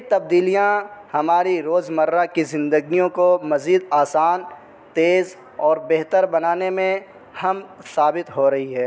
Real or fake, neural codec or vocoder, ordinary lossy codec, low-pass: real; none; none; none